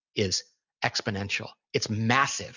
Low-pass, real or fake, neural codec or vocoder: 7.2 kHz; real; none